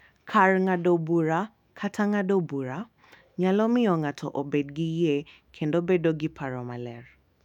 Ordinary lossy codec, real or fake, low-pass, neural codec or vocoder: none; fake; 19.8 kHz; autoencoder, 48 kHz, 128 numbers a frame, DAC-VAE, trained on Japanese speech